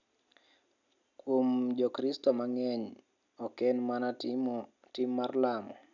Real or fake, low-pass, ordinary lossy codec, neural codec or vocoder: real; 7.2 kHz; none; none